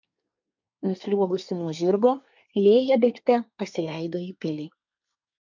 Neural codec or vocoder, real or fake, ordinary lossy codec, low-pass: codec, 24 kHz, 1 kbps, SNAC; fake; MP3, 64 kbps; 7.2 kHz